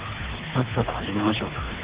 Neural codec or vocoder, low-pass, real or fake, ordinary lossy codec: codec, 24 kHz, 3 kbps, HILCodec; 3.6 kHz; fake; Opus, 16 kbps